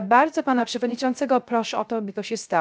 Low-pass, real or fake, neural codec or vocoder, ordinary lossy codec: none; fake; codec, 16 kHz, 0.3 kbps, FocalCodec; none